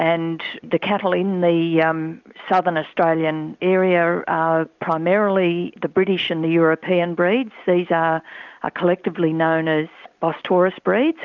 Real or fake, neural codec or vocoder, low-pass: real; none; 7.2 kHz